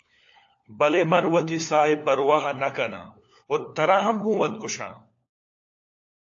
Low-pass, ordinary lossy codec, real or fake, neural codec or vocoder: 7.2 kHz; AAC, 48 kbps; fake; codec, 16 kHz, 4 kbps, FunCodec, trained on LibriTTS, 50 frames a second